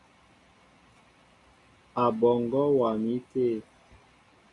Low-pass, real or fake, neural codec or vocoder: 10.8 kHz; real; none